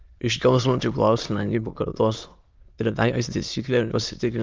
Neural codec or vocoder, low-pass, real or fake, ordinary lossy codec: autoencoder, 22.05 kHz, a latent of 192 numbers a frame, VITS, trained on many speakers; 7.2 kHz; fake; Opus, 32 kbps